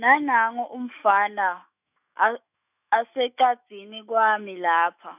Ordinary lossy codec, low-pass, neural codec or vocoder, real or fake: none; 3.6 kHz; autoencoder, 48 kHz, 128 numbers a frame, DAC-VAE, trained on Japanese speech; fake